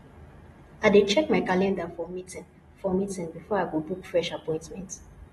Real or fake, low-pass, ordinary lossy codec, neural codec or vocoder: real; 19.8 kHz; AAC, 32 kbps; none